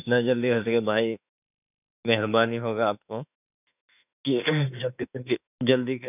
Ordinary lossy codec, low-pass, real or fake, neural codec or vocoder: none; 3.6 kHz; fake; autoencoder, 48 kHz, 32 numbers a frame, DAC-VAE, trained on Japanese speech